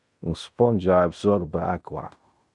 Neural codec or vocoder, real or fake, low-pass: codec, 16 kHz in and 24 kHz out, 0.9 kbps, LongCat-Audio-Codec, fine tuned four codebook decoder; fake; 10.8 kHz